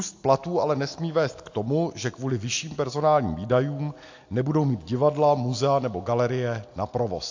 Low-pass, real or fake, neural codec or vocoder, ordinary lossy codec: 7.2 kHz; real; none; AAC, 48 kbps